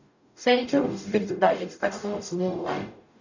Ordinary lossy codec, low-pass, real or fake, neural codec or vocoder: none; 7.2 kHz; fake; codec, 44.1 kHz, 0.9 kbps, DAC